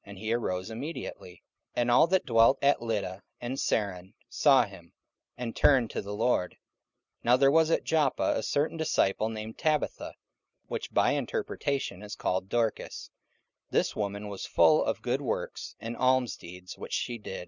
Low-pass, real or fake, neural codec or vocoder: 7.2 kHz; real; none